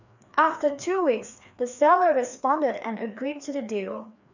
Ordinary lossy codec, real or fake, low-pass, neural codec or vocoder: none; fake; 7.2 kHz; codec, 16 kHz, 2 kbps, FreqCodec, larger model